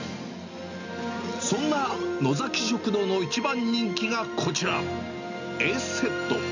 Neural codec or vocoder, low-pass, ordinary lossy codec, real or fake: none; 7.2 kHz; none; real